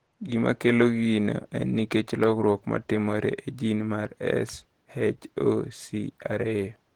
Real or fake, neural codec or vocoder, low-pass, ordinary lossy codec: fake; vocoder, 48 kHz, 128 mel bands, Vocos; 19.8 kHz; Opus, 16 kbps